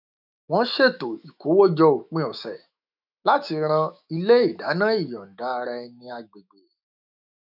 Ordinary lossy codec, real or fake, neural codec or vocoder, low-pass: none; fake; autoencoder, 48 kHz, 128 numbers a frame, DAC-VAE, trained on Japanese speech; 5.4 kHz